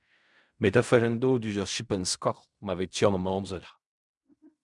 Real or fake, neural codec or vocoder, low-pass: fake; codec, 16 kHz in and 24 kHz out, 0.4 kbps, LongCat-Audio-Codec, fine tuned four codebook decoder; 10.8 kHz